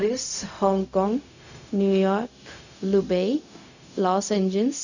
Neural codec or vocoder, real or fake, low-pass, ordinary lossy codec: codec, 16 kHz, 0.4 kbps, LongCat-Audio-Codec; fake; 7.2 kHz; none